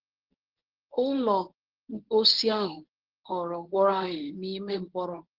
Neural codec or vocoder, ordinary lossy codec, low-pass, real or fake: codec, 24 kHz, 0.9 kbps, WavTokenizer, medium speech release version 1; Opus, 16 kbps; 5.4 kHz; fake